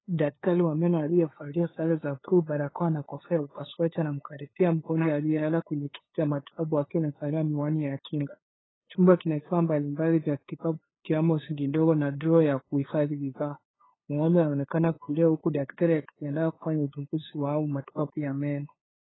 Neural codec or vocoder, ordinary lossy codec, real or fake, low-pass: codec, 16 kHz, 2 kbps, FunCodec, trained on LibriTTS, 25 frames a second; AAC, 16 kbps; fake; 7.2 kHz